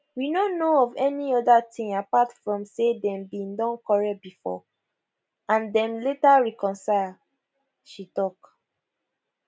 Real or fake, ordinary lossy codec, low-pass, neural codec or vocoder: real; none; none; none